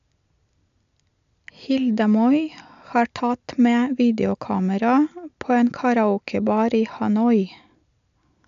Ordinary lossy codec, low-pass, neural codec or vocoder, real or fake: AAC, 96 kbps; 7.2 kHz; none; real